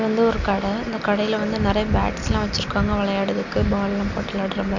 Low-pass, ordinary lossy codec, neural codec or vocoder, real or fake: 7.2 kHz; MP3, 48 kbps; none; real